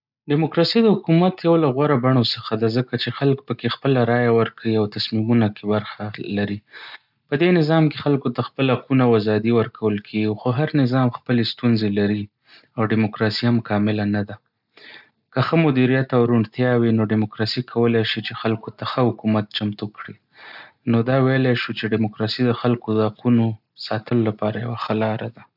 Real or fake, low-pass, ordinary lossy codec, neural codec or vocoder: real; 5.4 kHz; none; none